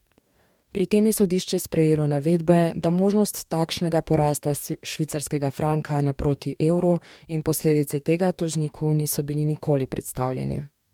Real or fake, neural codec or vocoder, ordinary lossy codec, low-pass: fake; codec, 44.1 kHz, 2.6 kbps, DAC; MP3, 96 kbps; 19.8 kHz